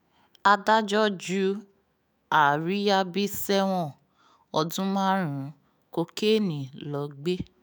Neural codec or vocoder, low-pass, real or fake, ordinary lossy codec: autoencoder, 48 kHz, 128 numbers a frame, DAC-VAE, trained on Japanese speech; none; fake; none